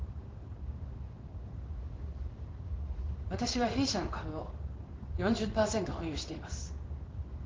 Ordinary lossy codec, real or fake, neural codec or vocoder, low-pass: Opus, 16 kbps; fake; codec, 16 kHz in and 24 kHz out, 1 kbps, XY-Tokenizer; 7.2 kHz